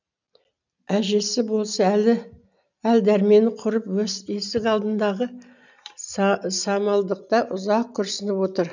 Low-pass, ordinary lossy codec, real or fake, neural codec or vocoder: 7.2 kHz; none; real; none